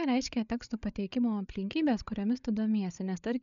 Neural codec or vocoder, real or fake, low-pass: codec, 16 kHz, 8 kbps, FreqCodec, larger model; fake; 7.2 kHz